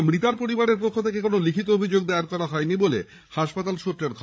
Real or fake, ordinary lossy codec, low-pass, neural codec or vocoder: fake; none; none; codec, 16 kHz, 16 kbps, FreqCodec, larger model